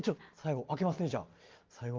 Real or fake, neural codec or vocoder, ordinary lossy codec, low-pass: real; none; Opus, 32 kbps; 7.2 kHz